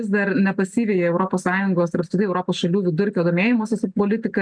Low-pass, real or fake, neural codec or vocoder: 9.9 kHz; real; none